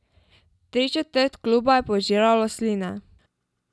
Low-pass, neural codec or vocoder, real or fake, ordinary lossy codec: none; none; real; none